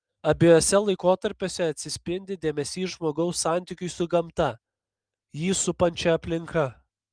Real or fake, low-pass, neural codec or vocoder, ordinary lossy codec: real; 9.9 kHz; none; Opus, 32 kbps